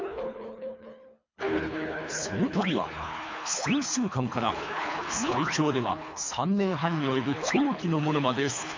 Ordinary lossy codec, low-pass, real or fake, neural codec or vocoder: MP3, 64 kbps; 7.2 kHz; fake; codec, 24 kHz, 3 kbps, HILCodec